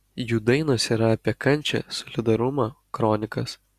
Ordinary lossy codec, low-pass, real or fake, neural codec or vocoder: Opus, 64 kbps; 14.4 kHz; real; none